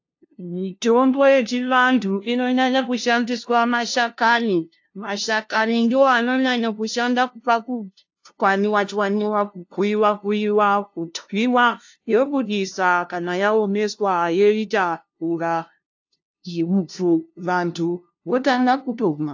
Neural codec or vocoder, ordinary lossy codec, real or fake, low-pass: codec, 16 kHz, 0.5 kbps, FunCodec, trained on LibriTTS, 25 frames a second; AAC, 48 kbps; fake; 7.2 kHz